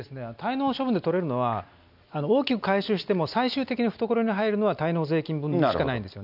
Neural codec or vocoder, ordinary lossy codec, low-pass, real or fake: none; none; 5.4 kHz; real